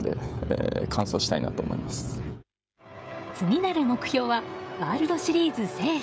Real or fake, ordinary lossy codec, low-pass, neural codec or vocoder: fake; none; none; codec, 16 kHz, 16 kbps, FreqCodec, smaller model